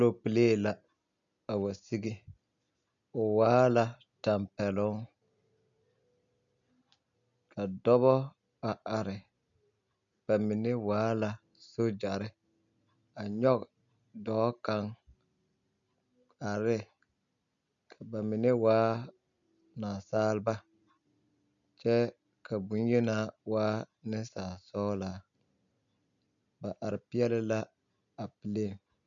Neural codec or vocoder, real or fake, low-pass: none; real; 7.2 kHz